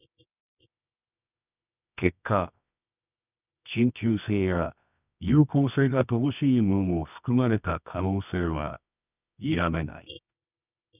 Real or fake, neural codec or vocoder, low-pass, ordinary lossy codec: fake; codec, 24 kHz, 0.9 kbps, WavTokenizer, medium music audio release; 3.6 kHz; none